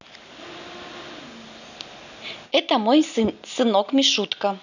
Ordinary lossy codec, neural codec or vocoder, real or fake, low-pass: none; none; real; 7.2 kHz